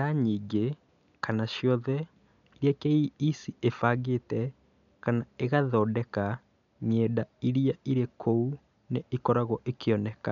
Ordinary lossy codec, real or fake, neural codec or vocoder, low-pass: MP3, 96 kbps; real; none; 7.2 kHz